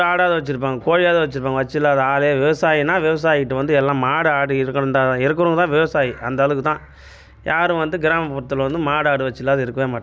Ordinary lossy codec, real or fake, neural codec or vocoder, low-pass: none; real; none; none